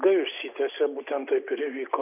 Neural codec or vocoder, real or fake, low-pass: none; real; 3.6 kHz